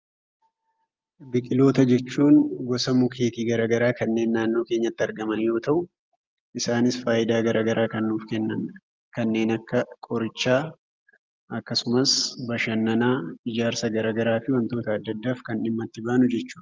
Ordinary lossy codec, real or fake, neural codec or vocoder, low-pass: Opus, 24 kbps; real; none; 7.2 kHz